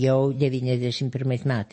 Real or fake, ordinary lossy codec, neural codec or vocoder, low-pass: real; MP3, 32 kbps; none; 9.9 kHz